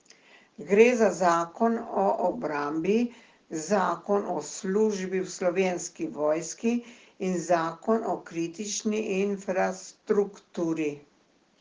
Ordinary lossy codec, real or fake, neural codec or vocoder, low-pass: Opus, 16 kbps; real; none; 7.2 kHz